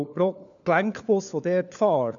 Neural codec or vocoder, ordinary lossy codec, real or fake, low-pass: codec, 16 kHz, 4 kbps, FunCodec, trained on LibriTTS, 50 frames a second; MP3, 96 kbps; fake; 7.2 kHz